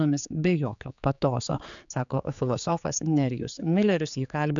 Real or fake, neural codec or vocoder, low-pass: fake; codec, 16 kHz, 4 kbps, X-Codec, HuBERT features, trained on general audio; 7.2 kHz